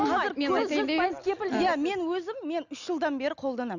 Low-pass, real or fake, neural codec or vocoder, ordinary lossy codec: 7.2 kHz; real; none; none